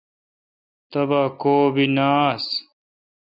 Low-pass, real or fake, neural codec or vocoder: 5.4 kHz; real; none